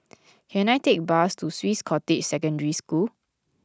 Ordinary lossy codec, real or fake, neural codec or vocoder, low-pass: none; real; none; none